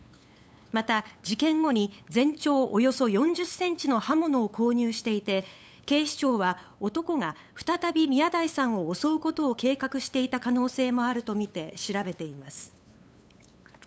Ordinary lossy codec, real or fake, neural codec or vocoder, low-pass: none; fake; codec, 16 kHz, 8 kbps, FunCodec, trained on LibriTTS, 25 frames a second; none